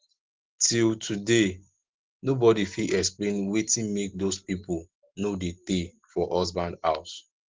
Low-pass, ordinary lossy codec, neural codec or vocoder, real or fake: 7.2 kHz; Opus, 16 kbps; none; real